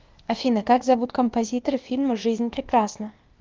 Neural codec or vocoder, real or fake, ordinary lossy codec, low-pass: codec, 16 kHz, 0.8 kbps, ZipCodec; fake; Opus, 32 kbps; 7.2 kHz